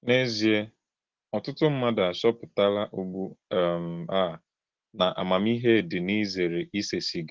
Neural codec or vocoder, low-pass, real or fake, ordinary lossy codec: none; 7.2 kHz; real; Opus, 16 kbps